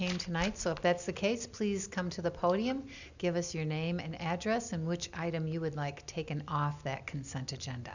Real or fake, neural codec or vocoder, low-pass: real; none; 7.2 kHz